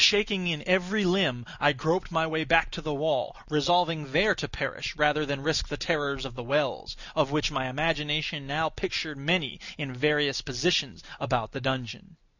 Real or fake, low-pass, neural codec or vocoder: real; 7.2 kHz; none